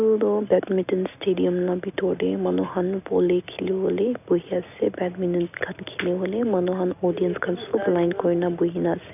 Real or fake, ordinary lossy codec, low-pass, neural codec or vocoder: real; none; 3.6 kHz; none